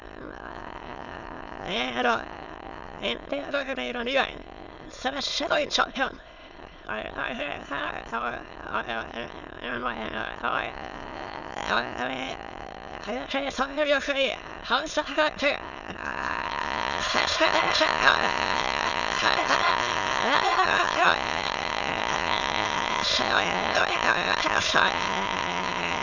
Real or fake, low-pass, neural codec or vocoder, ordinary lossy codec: fake; 7.2 kHz; autoencoder, 22.05 kHz, a latent of 192 numbers a frame, VITS, trained on many speakers; none